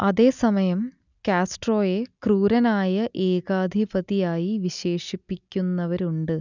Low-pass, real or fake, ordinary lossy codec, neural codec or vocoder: 7.2 kHz; real; none; none